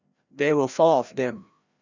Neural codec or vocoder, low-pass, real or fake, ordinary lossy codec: codec, 16 kHz, 1 kbps, FreqCodec, larger model; 7.2 kHz; fake; Opus, 64 kbps